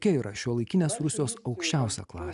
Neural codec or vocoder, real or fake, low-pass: none; real; 10.8 kHz